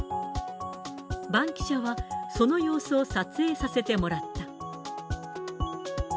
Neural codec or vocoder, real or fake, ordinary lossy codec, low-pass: none; real; none; none